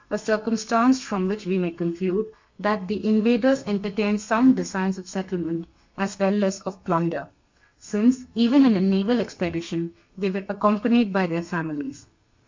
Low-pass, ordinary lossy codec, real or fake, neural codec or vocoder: 7.2 kHz; MP3, 48 kbps; fake; codec, 32 kHz, 1.9 kbps, SNAC